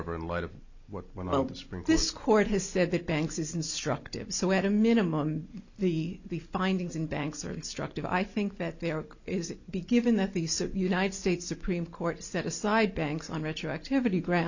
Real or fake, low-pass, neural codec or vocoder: real; 7.2 kHz; none